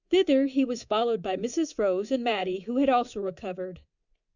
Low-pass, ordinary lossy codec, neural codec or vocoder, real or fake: 7.2 kHz; Opus, 64 kbps; codec, 24 kHz, 3.1 kbps, DualCodec; fake